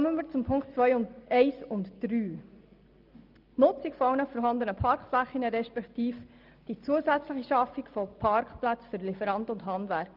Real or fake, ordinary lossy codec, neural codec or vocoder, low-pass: real; Opus, 24 kbps; none; 5.4 kHz